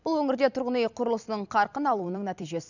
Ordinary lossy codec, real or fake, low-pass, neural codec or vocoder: none; fake; 7.2 kHz; vocoder, 44.1 kHz, 128 mel bands every 256 samples, BigVGAN v2